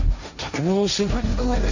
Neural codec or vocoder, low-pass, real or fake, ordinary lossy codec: codec, 16 kHz, 1.1 kbps, Voila-Tokenizer; 7.2 kHz; fake; none